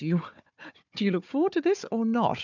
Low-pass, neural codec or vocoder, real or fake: 7.2 kHz; codec, 16 kHz, 4 kbps, FunCodec, trained on Chinese and English, 50 frames a second; fake